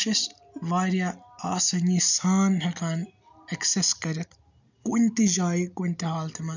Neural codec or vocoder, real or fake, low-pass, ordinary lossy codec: none; real; 7.2 kHz; none